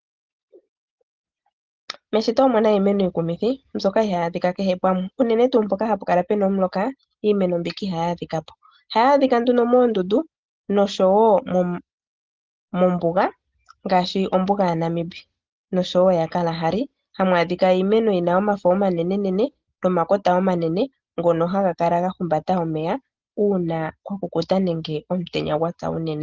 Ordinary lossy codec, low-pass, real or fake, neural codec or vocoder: Opus, 16 kbps; 7.2 kHz; real; none